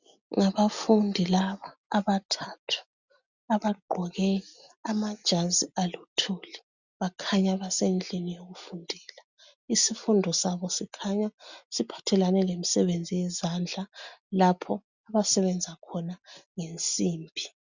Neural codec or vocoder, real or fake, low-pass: none; real; 7.2 kHz